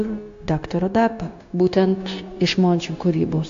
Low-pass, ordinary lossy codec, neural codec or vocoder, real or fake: 7.2 kHz; AAC, 64 kbps; codec, 16 kHz, 0.9 kbps, LongCat-Audio-Codec; fake